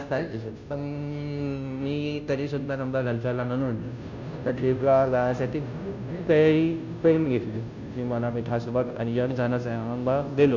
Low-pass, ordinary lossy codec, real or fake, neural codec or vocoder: 7.2 kHz; none; fake; codec, 16 kHz, 0.5 kbps, FunCodec, trained on Chinese and English, 25 frames a second